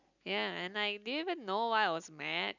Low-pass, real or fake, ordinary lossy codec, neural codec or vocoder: 7.2 kHz; real; none; none